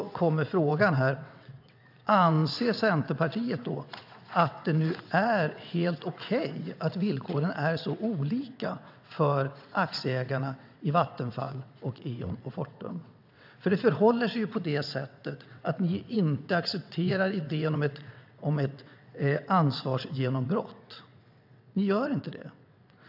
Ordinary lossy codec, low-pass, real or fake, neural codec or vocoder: AAC, 48 kbps; 5.4 kHz; fake; vocoder, 22.05 kHz, 80 mel bands, WaveNeXt